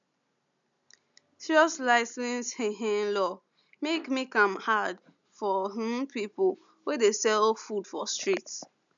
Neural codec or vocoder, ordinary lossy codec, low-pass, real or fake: none; MP3, 96 kbps; 7.2 kHz; real